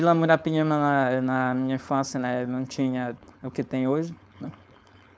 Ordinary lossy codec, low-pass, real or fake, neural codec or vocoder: none; none; fake; codec, 16 kHz, 4.8 kbps, FACodec